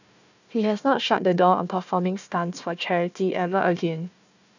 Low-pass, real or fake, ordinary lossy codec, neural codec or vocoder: 7.2 kHz; fake; none; codec, 16 kHz, 1 kbps, FunCodec, trained on Chinese and English, 50 frames a second